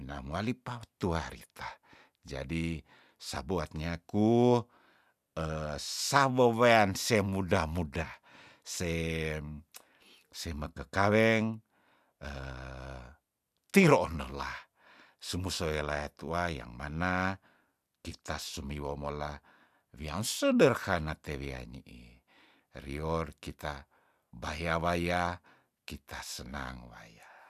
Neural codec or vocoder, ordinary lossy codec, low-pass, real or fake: none; none; 14.4 kHz; real